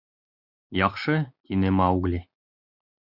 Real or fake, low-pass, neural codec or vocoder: real; 5.4 kHz; none